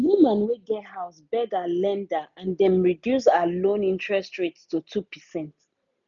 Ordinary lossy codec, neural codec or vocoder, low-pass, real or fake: none; none; 7.2 kHz; real